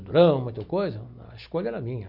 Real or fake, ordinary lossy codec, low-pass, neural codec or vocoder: real; MP3, 48 kbps; 5.4 kHz; none